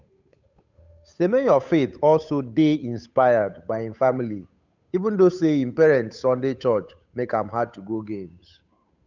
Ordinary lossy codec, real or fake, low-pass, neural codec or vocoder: none; fake; 7.2 kHz; codec, 16 kHz, 8 kbps, FunCodec, trained on Chinese and English, 25 frames a second